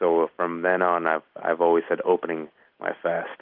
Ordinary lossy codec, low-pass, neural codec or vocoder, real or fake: Opus, 32 kbps; 5.4 kHz; none; real